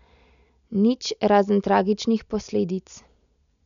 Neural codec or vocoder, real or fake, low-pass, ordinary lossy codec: none; real; 7.2 kHz; none